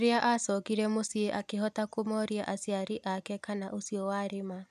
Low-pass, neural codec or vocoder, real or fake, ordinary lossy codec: 14.4 kHz; none; real; MP3, 96 kbps